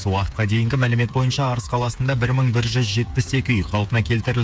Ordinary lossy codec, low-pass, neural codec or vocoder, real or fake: none; none; codec, 16 kHz, 8 kbps, FreqCodec, smaller model; fake